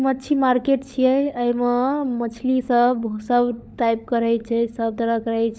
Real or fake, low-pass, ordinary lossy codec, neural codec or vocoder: fake; none; none; codec, 16 kHz, 16 kbps, FunCodec, trained on LibriTTS, 50 frames a second